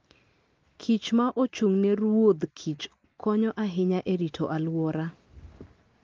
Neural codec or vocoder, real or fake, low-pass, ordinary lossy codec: none; real; 7.2 kHz; Opus, 32 kbps